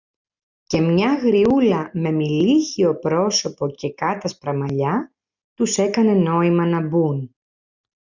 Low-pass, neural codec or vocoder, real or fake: 7.2 kHz; none; real